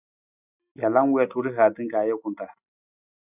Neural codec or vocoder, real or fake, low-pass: none; real; 3.6 kHz